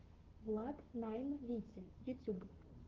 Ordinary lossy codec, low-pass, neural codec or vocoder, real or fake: Opus, 16 kbps; 7.2 kHz; codec, 44.1 kHz, 7.8 kbps, Pupu-Codec; fake